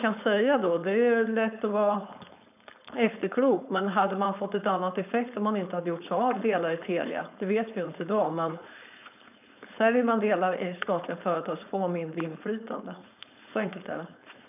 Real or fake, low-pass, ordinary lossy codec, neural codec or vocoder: fake; 3.6 kHz; none; codec, 16 kHz, 4.8 kbps, FACodec